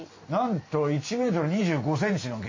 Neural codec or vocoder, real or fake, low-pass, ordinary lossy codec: none; real; 7.2 kHz; MP3, 32 kbps